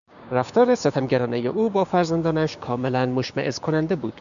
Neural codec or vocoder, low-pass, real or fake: codec, 16 kHz, 6 kbps, DAC; 7.2 kHz; fake